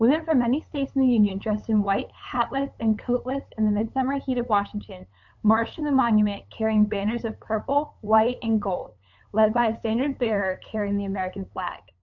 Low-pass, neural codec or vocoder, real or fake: 7.2 kHz; codec, 16 kHz, 8 kbps, FunCodec, trained on LibriTTS, 25 frames a second; fake